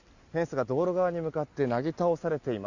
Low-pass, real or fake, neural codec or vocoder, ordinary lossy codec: 7.2 kHz; real; none; Opus, 64 kbps